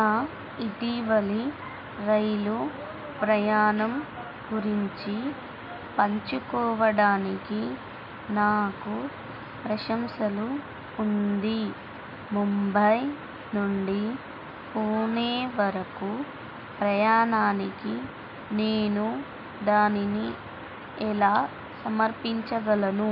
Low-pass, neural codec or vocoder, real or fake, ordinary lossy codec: 5.4 kHz; none; real; none